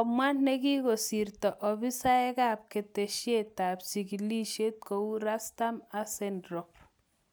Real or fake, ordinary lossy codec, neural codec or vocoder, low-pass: real; none; none; none